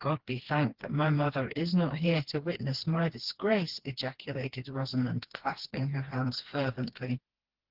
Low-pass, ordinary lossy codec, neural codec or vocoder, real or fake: 5.4 kHz; Opus, 16 kbps; codec, 16 kHz, 2 kbps, FreqCodec, smaller model; fake